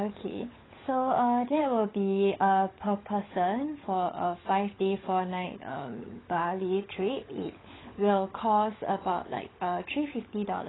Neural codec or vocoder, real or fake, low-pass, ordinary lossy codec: codec, 16 kHz, 16 kbps, FunCodec, trained on LibriTTS, 50 frames a second; fake; 7.2 kHz; AAC, 16 kbps